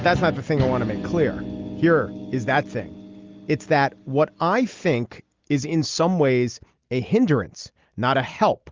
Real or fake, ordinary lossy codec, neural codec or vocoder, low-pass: real; Opus, 32 kbps; none; 7.2 kHz